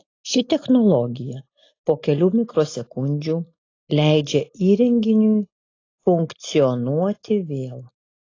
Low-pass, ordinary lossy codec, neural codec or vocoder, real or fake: 7.2 kHz; AAC, 32 kbps; none; real